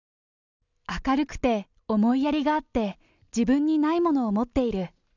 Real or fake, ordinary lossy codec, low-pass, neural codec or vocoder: real; none; 7.2 kHz; none